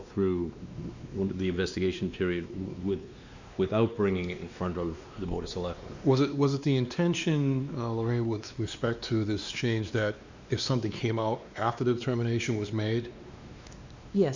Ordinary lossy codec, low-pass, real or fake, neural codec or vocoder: Opus, 64 kbps; 7.2 kHz; fake; codec, 16 kHz, 2 kbps, X-Codec, WavLM features, trained on Multilingual LibriSpeech